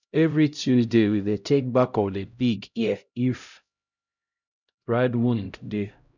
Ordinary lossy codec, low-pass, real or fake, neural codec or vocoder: none; 7.2 kHz; fake; codec, 16 kHz, 0.5 kbps, X-Codec, HuBERT features, trained on LibriSpeech